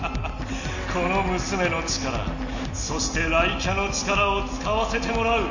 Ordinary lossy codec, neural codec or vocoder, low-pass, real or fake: none; none; 7.2 kHz; real